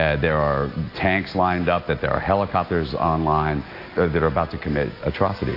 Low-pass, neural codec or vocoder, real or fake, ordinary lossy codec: 5.4 kHz; none; real; AAC, 32 kbps